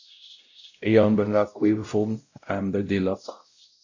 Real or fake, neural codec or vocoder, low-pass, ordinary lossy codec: fake; codec, 16 kHz, 0.5 kbps, X-Codec, WavLM features, trained on Multilingual LibriSpeech; 7.2 kHz; AAC, 48 kbps